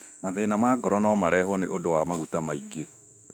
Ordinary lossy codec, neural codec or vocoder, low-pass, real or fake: none; autoencoder, 48 kHz, 32 numbers a frame, DAC-VAE, trained on Japanese speech; 19.8 kHz; fake